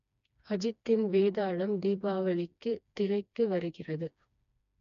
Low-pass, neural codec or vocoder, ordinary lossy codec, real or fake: 7.2 kHz; codec, 16 kHz, 2 kbps, FreqCodec, smaller model; none; fake